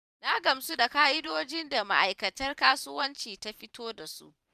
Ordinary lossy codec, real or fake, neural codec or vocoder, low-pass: none; fake; vocoder, 44.1 kHz, 128 mel bands every 512 samples, BigVGAN v2; 14.4 kHz